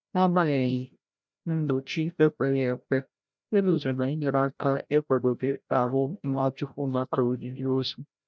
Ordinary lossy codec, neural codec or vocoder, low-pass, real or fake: none; codec, 16 kHz, 0.5 kbps, FreqCodec, larger model; none; fake